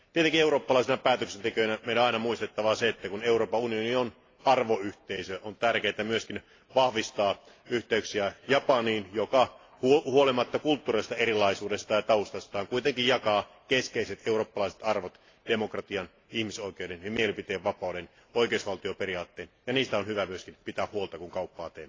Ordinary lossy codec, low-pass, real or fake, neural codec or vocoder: AAC, 32 kbps; 7.2 kHz; real; none